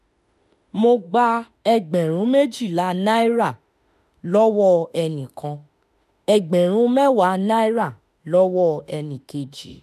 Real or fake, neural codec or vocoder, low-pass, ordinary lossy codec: fake; autoencoder, 48 kHz, 32 numbers a frame, DAC-VAE, trained on Japanese speech; 14.4 kHz; none